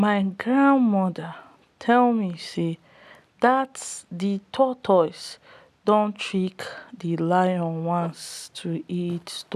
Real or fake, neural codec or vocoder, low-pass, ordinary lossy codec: real; none; 14.4 kHz; none